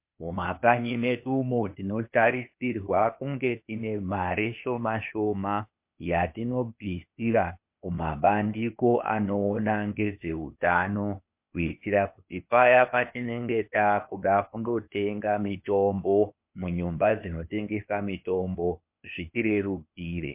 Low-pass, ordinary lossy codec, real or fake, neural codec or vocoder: 3.6 kHz; MP3, 32 kbps; fake; codec, 16 kHz, 0.8 kbps, ZipCodec